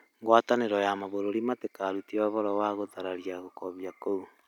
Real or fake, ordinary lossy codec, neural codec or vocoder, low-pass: real; none; none; 19.8 kHz